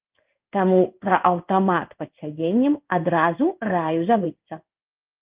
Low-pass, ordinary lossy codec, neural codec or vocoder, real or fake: 3.6 kHz; Opus, 24 kbps; codec, 16 kHz in and 24 kHz out, 1 kbps, XY-Tokenizer; fake